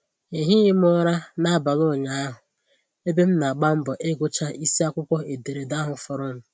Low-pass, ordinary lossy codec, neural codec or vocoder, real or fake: none; none; none; real